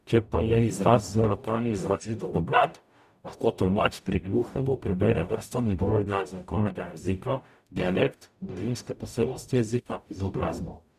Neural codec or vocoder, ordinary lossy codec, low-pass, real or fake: codec, 44.1 kHz, 0.9 kbps, DAC; none; 14.4 kHz; fake